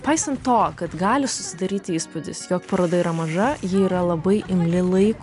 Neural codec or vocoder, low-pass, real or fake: none; 10.8 kHz; real